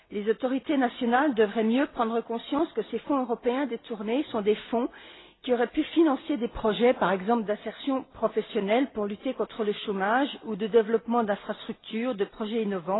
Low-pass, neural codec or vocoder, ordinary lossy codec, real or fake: 7.2 kHz; none; AAC, 16 kbps; real